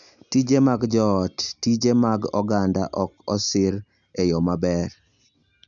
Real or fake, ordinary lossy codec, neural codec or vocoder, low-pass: real; none; none; 7.2 kHz